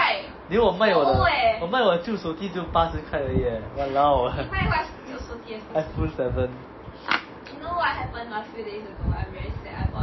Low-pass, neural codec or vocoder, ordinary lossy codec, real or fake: 7.2 kHz; none; MP3, 24 kbps; real